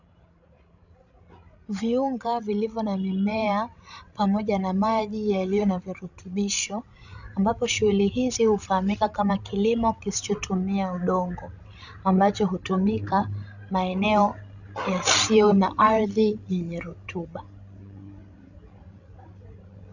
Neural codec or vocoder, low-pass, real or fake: codec, 16 kHz, 16 kbps, FreqCodec, larger model; 7.2 kHz; fake